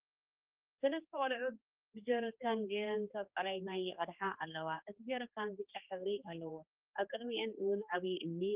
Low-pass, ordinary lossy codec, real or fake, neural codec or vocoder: 3.6 kHz; Opus, 32 kbps; fake; codec, 16 kHz, 2 kbps, X-Codec, HuBERT features, trained on general audio